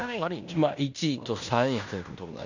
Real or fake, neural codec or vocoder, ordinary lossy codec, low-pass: fake; codec, 16 kHz in and 24 kHz out, 0.9 kbps, LongCat-Audio-Codec, fine tuned four codebook decoder; none; 7.2 kHz